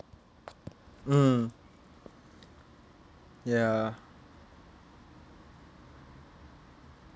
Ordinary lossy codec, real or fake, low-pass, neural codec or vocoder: none; real; none; none